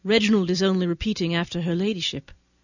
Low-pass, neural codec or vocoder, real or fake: 7.2 kHz; none; real